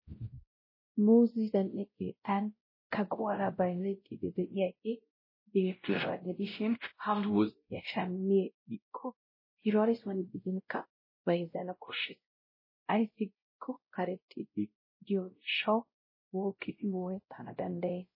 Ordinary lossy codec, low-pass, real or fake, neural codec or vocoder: MP3, 24 kbps; 5.4 kHz; fake; codec, 16 kHz, 0.5 kbps, X-Codec, WavLM features, trained on Multilingual LibriSpeech